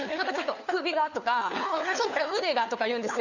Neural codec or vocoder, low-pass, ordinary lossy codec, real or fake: codec, 16 kHz, 4 kbps, FunCodec, trained on LibriTTS, 50 frames a second; 7.2 kHz; none; fake